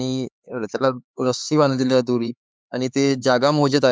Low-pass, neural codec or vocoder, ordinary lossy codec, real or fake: none; codec, 16 kHz, 4 kbps, X-Codec, HuBERT features, trained on LibriSpeech; none; fake